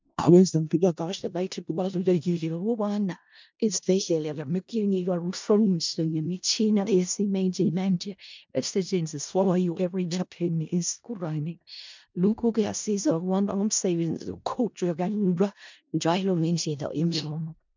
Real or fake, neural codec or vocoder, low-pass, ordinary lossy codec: fake; codec, 16 kHz in and 24 kHz out, 0.4 kbps, LongCat-Audio-Codec, four codebook decoder; 7.2 kHz; MP3, 64 kbps